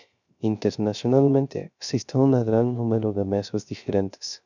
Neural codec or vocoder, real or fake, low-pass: codec, 16 kHz, 0.3 kbps, FocalCodec; fake; 7.2 kHz